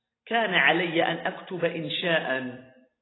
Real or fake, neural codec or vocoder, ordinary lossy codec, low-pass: real; none; AAC, 16 kbps; 7.2 kHz